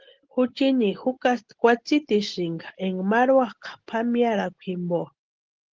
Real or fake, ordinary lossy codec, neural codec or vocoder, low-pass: real; Opus, 16 kbps; none; 7.2 kHz